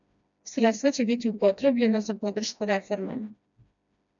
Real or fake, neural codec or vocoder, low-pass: fake; codec, 16 kHz, 1 kbps, FreqCodec, smaller model; 7.2 kHz